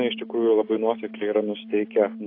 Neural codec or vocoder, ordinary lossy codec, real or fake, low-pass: none; AAC, 32 kbps; real; 5.4 kHz